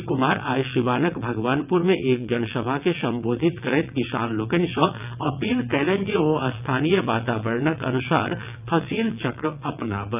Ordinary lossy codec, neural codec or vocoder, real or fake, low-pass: none; vocoder, 22.05 kHz, 80 mel bands, WaveNeXt; fake; 3.6 kHz